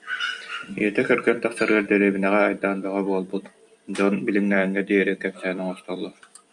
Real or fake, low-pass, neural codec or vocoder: fake; 10.8 kHz; vocoder, 44.1 kHz, 128 mel bands every 256 samples, BigVGAN v2